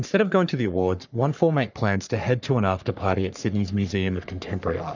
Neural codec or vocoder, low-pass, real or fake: codec, 44.1 kHz, 3.4 kbps, Pupu-Codec; 7.2 kHz; fake